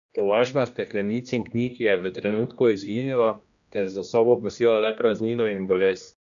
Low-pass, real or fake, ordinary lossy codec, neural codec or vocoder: 7.2 kHz; fake; none; codec, 16 kHz, 1 kbps, X-Codec, HuBERT features, trained on general audio